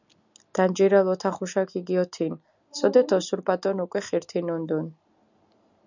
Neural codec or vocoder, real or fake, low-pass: none; real; 7.2 kHz